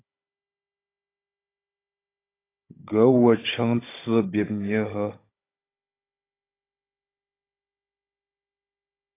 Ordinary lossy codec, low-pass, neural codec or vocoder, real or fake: AAC, 24 kbps; 3.6 kHz; codec, 16 kHz, 16 kbps, FunCodec, trained on Chinese and English, 50 frames a second; fake